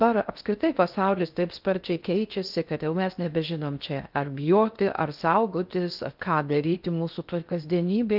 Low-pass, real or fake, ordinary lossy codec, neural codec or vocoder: 5.4 kHz; fake; Opus, 32 kbps; codec, 16 kHz in and 24 kHz out, 0.6 kbps, FocalCodec, streaming, 2048 codes